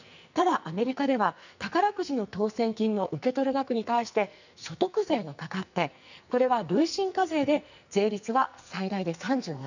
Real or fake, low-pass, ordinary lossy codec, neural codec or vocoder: fake; 7.2 kHz; none; codec, 44.1 kHz, 2.6 kbps, SNAC